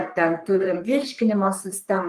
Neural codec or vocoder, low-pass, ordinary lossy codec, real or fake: codec, 44.1 kHz, 3.4 kbps, Pupu-Codec; 14.4 kHz; Opus, 32 kbps; fake